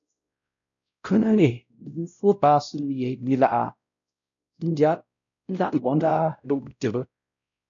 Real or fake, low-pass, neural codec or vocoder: fake; 7.2 kHz; codec, 16 kHz, 0.5 kbps, X-Codec, WavLM features, trained on Multilingual LibriSpeech